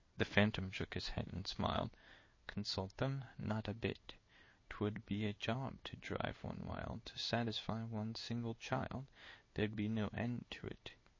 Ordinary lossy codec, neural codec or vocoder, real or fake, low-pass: MP3, 32 kbps; codec, 16 kHz in and 24 kHz out, 1 kbps, XY-Tokenizer; fake; 7.2 kHz